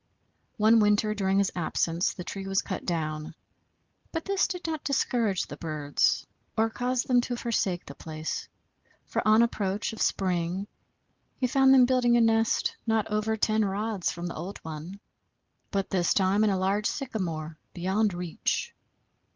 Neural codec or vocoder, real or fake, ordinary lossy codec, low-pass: none; real; Opus, 24 kbps; 7.2 kHz